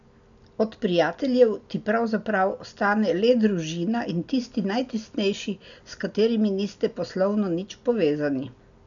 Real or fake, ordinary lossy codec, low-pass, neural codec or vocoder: real; none; 7.2 kHz; none